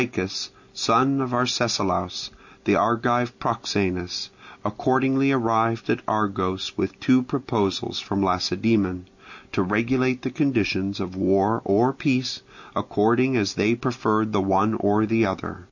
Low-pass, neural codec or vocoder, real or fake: 7.2 kHz; none; real